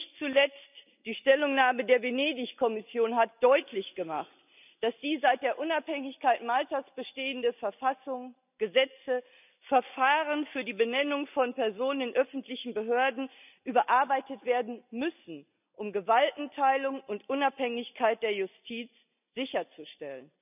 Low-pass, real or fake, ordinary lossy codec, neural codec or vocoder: 3.6 kHz; real; none; none